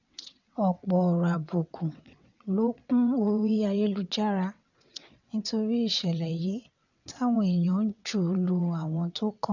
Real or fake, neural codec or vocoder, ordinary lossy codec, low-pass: fake; vocoder, 22.05 kHz, 80 mel bands, WaveNeXt; none; 7.2 kHz